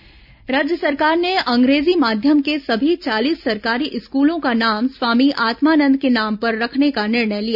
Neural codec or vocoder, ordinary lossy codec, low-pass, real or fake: none; none; 5.4 kHz; real